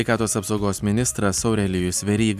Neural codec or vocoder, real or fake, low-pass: vocoder, 44.1 kHz, 128 mel bands every 256 samples, BigVGAN v2; fake; 14.4 kHz